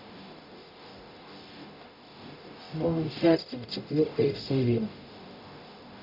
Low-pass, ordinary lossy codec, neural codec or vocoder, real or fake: 5.4 kHz; none; codec, 44.1 kHz, 0.9 kbps, DAC; fake